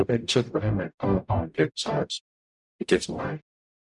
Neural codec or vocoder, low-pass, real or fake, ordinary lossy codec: codec, 44.1 kHz, 0.9 kbps, DAC; 10.8 kHz; fake; none